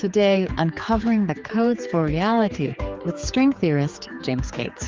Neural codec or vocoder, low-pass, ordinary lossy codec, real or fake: codec, 16 kHz, 4 kbps, X-Codec, HuBERT features, trained on general audio; 7.2 kHz; Opus, 32 kbps; fake